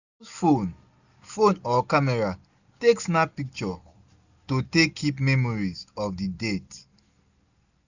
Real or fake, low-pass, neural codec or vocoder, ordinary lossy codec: real; 7.2 kHz; none; none